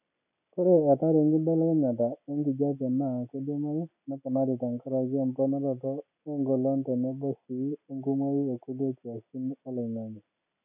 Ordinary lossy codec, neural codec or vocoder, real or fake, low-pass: none; none; real; 3.6 kHz